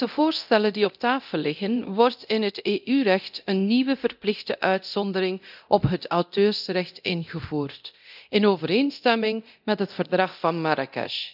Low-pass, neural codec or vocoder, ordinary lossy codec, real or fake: 5.4 kHz; codec, 24 kHz, 0.9 kbps, DualCodec; none; fake